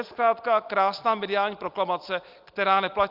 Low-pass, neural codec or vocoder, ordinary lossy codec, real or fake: 5.4 kHz; none; Opus, 24 kbps; real